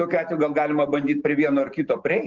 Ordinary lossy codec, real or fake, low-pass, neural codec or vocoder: Opus, 16 kbps; real; 7.2 kHz; none